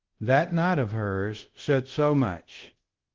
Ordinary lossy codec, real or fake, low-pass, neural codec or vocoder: Opus, 16 kbps; fake; 7.2 kHz; codec, 24 kHz, 0.5 kbps, DualCodec